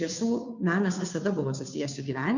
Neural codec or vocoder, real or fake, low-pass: codec, 16 kHz, 2 kbps, FunCodec, trained on Chinese and English, 25 frames a second; fake; 7.2 kHz